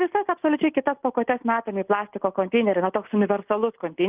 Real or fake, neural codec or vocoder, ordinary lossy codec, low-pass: real; none; Opus, 32 kbps; 3.6 kHz